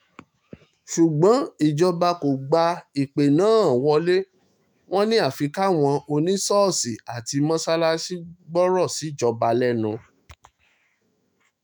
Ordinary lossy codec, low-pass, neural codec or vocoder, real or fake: none; none; autoencoder, 48 kHz, 128 numbers a frame, DAC-VAE, trained on Japanese speech; fake